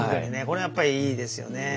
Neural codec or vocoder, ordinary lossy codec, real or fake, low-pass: none; none; real; none